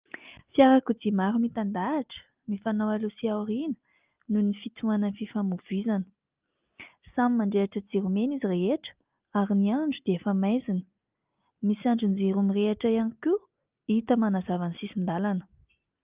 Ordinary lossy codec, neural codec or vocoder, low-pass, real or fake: Opus, 24 kbps; none; 3.6 kHz; real